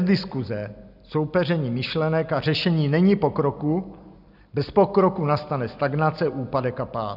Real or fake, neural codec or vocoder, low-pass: real; none; 5.4 kHz